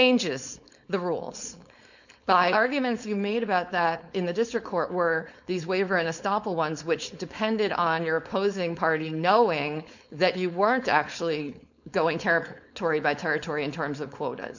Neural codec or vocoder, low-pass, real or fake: codec, 16 kHz, 4.8 kbps, FACodec; 7.2 kHz; fake